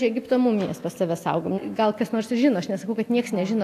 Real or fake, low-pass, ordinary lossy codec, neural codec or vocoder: real; 14.4 kHz; AAC, 64 kbps; none